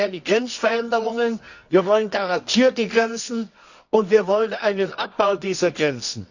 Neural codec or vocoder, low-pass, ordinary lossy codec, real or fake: codec, 24 kHz, 0.9 kbps, WavTokenizer, medium music audio release; 7.2 kHz; none; fake